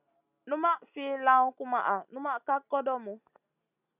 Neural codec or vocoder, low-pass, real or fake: none; 3.6 kHz; real